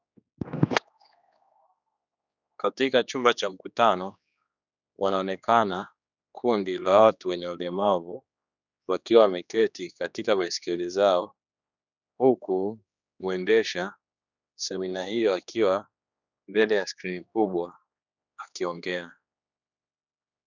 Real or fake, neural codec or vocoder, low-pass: fake; codec, 16 kHz, 2 kbps, X-Codec, HuBERT features, trained on general audio; 7.2 kHz